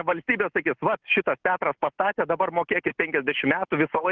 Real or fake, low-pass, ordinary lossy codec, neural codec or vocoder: real; 7.2 kHz; Opus, 16 kbps; none